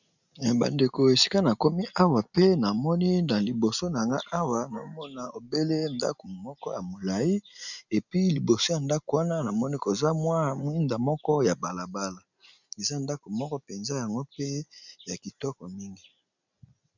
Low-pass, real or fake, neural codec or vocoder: 7.2 kHz; real; none